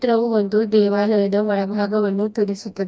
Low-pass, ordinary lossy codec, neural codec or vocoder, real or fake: none; none; codec, 16 kHz, 1 kbps, FreqCodec, smaller model; fake